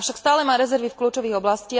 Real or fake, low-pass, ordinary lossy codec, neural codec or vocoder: real; none; none; none